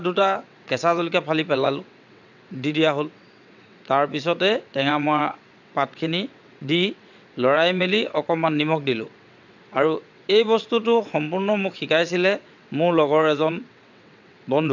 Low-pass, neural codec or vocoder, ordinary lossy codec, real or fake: 7.2 kHz; vocoder, 22.05 kHz, 80 mel bands, WaveNeXt; none; fake